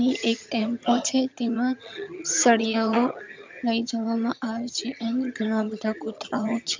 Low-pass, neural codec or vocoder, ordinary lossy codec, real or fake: 7.2 kHz; vocoder, 22.05 kHz, 80 mel bands, HiFi-GAN; MP3, 64 kbps; fake